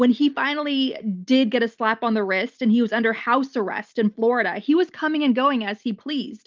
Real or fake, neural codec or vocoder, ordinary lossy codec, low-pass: real; none; Opus, 24 kbps; 7.2 kHz